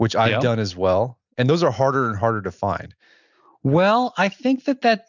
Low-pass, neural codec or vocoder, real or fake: 7.2 kHz; none; real